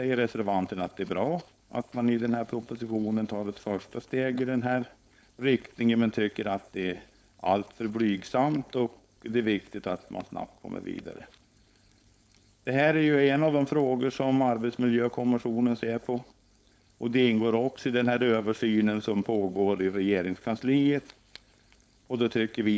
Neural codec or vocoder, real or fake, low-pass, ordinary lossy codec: codec, 16 kHz, 4.8 kbps, FACodec; fake; none; none